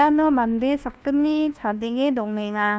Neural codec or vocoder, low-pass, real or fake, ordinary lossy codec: codec, 16 kHz, 1 kbps, FunCodec, trained on LibriTTS, 50 frames a second; none; fake; none